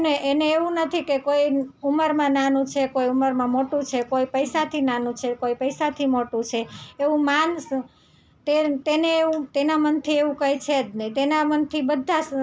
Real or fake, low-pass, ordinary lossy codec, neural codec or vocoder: real; none; none; none